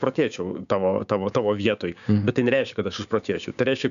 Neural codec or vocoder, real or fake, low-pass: codec, 16 kHz, 6 kbps, DAC; fake; 7.2 kHz